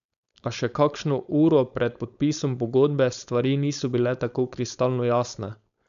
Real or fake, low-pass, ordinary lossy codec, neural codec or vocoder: fake; 7.2 kHz; none; codec, 16 kHz, 4.8 kbps, FACodec